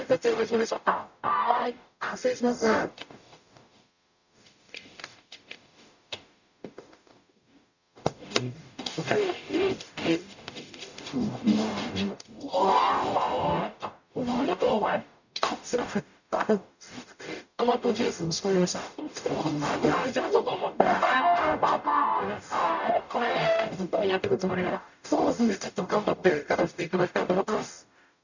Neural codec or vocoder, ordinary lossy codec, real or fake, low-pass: codec, 44.1 kHz, 0.9 kbps, DAC; none; fake; 7.2 kHz